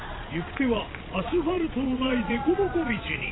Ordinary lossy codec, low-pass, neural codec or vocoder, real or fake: AAC, 16 kbps; 7.2 kHz; vocoder, 22.05 kHz, 80 mel bands, Vocos; fake